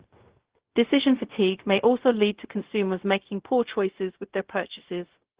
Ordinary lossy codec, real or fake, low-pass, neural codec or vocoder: Opus, 16 kbps; fake; 3.6 kHz; codec, 16 kHz, 0.4 kbps, LongCat-Audio-Codec